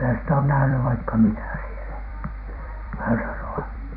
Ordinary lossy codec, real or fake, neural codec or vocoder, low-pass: none; fake; vocoder, 44.1 kHz, 128 mel bands every 256 samples, BigVGAN v2; 5.4 kHz